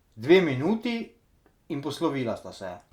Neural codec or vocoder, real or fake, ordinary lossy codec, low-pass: none; real; Opus, 64 kbps; 19.8 kHz